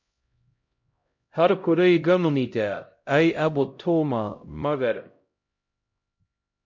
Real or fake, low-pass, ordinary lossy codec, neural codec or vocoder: fake; 7.2 kHz; MP3, 48 kbps; codec, 16 kHz, 0.5 kbps, X-Codec, HuBERT features, trained on LibriSpeech